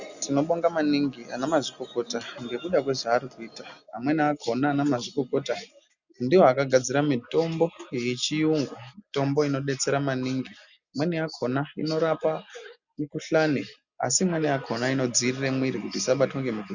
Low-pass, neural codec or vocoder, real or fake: 7.2 kHz; none; real